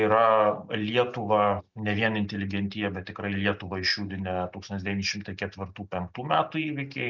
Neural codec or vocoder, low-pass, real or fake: none; 7.2 kHz; real